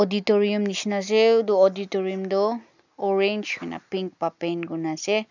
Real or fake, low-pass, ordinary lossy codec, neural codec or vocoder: real; 7.2 kHz; none; none